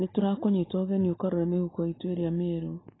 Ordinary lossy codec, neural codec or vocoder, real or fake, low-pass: AAC, 16 kbps; none; real; 7.2 kHz